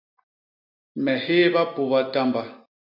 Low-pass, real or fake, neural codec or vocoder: 5.4 kHz; real; none